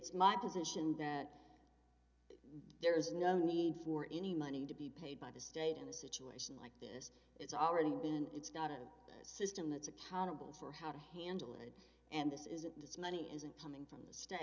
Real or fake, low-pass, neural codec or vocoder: real; 7.2 kHz; none